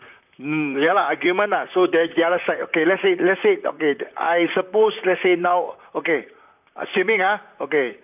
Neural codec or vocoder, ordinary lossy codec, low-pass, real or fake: vocoder, 44.1 kHz, 128 mel bands, Pupu-Vocoder; none; 3.6 kHz; fake